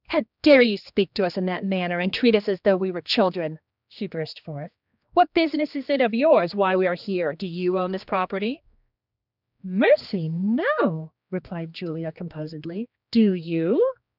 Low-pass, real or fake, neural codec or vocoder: 5.4 kHz; fake; codec, 16 kHz, 2 kbps, X-Codec, HuBERT features, trained on general audio